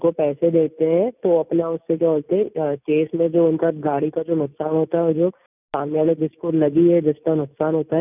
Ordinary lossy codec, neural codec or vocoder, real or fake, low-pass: none; none; real; 3.6 kHz